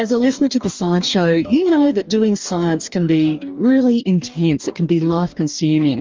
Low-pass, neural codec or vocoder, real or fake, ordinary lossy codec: 7.2 kHz; codec, 44.1 kHz, 2.6 kbps, DAC; fake; Opus, 32 kbps